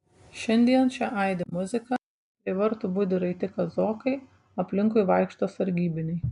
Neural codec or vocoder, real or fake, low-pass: none; real; 10.8 kHz